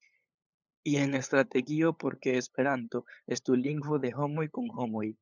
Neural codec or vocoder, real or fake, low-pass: codec, 16 kHz, 8 kbps, FunCodec, trained on LibriTTS, 25 frames a second; fake; 7.2 kHz